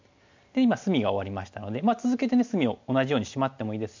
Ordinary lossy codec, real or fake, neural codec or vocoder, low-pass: MP3, 64 kbps; real; none; 7.2 kHz